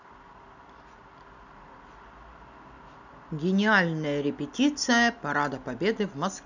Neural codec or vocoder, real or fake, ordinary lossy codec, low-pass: none; real; none; 7.2 kHz